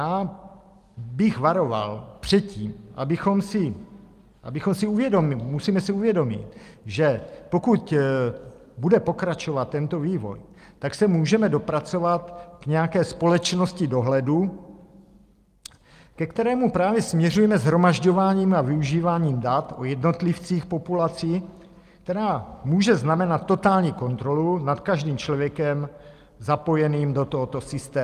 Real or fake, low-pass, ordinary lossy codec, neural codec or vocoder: real; 14.4 kHz; Opus, 32 kbps; none